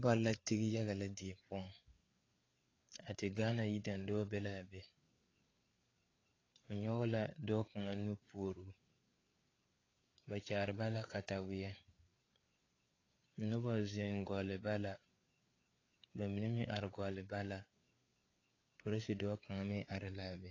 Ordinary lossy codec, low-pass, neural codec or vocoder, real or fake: AAC, 32 kbps; 7.2 kHz; codec, 16 kHz, 4 kbps, FreqCodec, larger model; fake